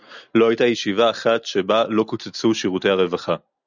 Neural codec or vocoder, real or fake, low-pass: none; real; 7.2 kHz